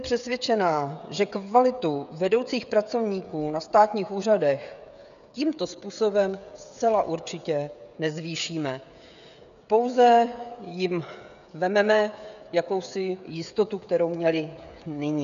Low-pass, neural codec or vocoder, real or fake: 7.2 kHz; codec, 16 kHz, 16 kbps, FreqCodec, smaller model; fake